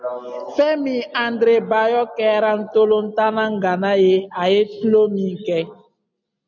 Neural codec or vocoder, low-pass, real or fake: none; 7.2 kHz; real